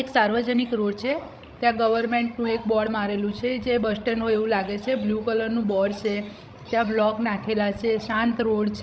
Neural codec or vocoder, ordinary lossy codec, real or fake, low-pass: codec, 16 kHz, 16 kbps, FreqCodec, larger model; none; fake; none